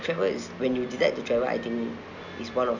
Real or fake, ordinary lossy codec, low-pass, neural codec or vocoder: real; none; 7.2 kHz; none